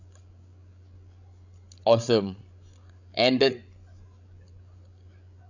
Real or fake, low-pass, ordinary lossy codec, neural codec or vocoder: fake; 7.2 kHz; none; codec, 16 kHz, 16 kbps, FreqCodec, larger model